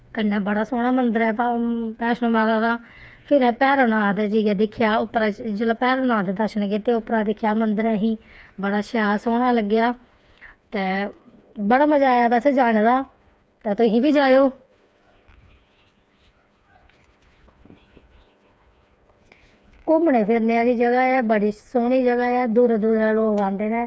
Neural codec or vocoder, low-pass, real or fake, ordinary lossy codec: codec, 16 kHz, 4 kbps, FreqCodec, smaller model; none; fake; none